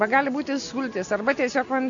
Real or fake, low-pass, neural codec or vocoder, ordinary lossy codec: real; 7.2 kHz; none; MP3, 96 kbps